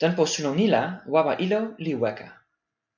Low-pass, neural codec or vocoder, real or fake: 7.2 kHz; none; real